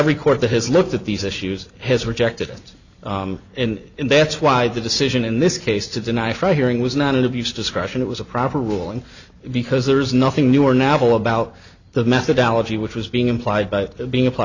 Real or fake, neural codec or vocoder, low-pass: real; none; 7.2 kHz